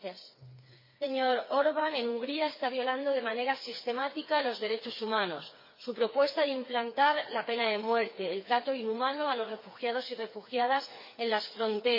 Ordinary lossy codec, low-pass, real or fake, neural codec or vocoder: MP3, 24 kbps; 5.4 kHz; fake; codec, 16 kHz, 4 kbps, FreqCodec, smaller model